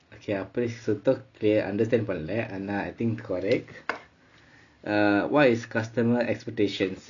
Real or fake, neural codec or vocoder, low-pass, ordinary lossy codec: real; none; 7.2 kHz; none